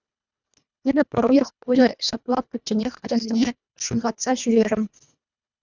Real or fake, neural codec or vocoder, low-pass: fake; codec, 24 kHz, 1.5 kbps, HILCodec; 7.2 kHz